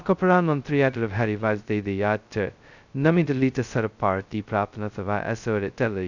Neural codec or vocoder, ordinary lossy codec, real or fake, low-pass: codec, 16 kHz, 0.2 kbps, FocalCodec; none; fake; 7.2 kHz